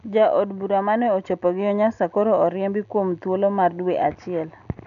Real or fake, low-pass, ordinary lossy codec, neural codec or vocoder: real; 7.2 kHz; none; none